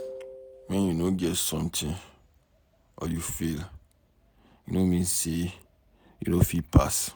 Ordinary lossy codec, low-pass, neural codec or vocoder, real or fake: none; none; none; real